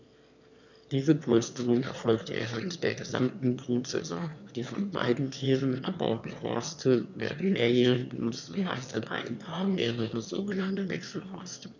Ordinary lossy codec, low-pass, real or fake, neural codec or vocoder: none; 7.2 kHz; fake; autoencoder, 22.05 kHz, a latent of 192 numbers a frame, VITS, trained on one speaker